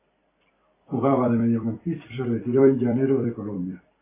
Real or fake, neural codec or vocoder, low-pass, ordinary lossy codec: fake; vocoder, 44.1 kHz, 128 mel bands every 256 samples, BigVGAN v2; 3.6 kHz; AAC, 16 kbps